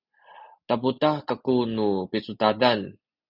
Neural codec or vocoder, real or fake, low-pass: none; real; 5.4 kHz